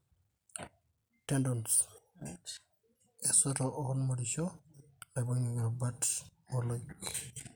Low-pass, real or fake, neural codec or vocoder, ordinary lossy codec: none; real; none; none